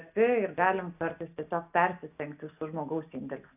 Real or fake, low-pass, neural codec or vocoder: real; 3.6 kHz; none